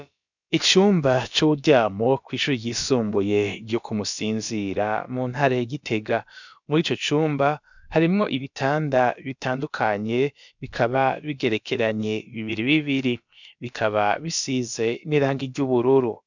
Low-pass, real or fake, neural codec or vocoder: 7.2 kHz; fake; codec, 16 kHz, about 1 kbps, DyCAST, with the encoder's durations